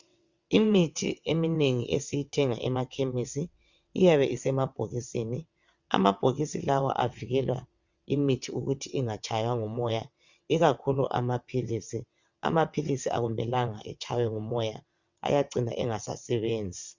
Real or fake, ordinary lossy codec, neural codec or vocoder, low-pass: fake; Opus, 64 kbps; vocoder, 22.05 kHz, 80 mel bands, WaveNeXt; 7.2 kHz